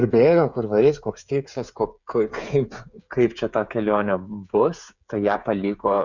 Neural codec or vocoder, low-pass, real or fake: codec, 16 kHz, 8 kbps, FreqCodec, smaller model; 7.2 kHz; fake